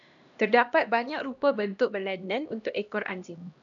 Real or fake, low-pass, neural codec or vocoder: fake; 7.2 kHz; codec, 16 kHz, 1 kbps, X-Codec, HuBERT features, trained on LibriSpeech